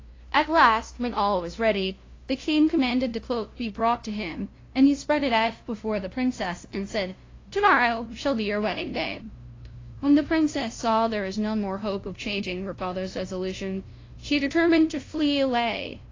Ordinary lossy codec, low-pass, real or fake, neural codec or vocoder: AAC, 32 kbps; 7.2 kHz; fake; codec, 16 kHz, 0.5 kbps, FunCodec, trained on LibriTTS, 25 frames a second